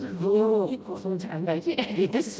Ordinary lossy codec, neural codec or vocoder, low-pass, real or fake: none; codec, 16 kHz, 0.5 kbps, FreqCodec, smaller model; none; fake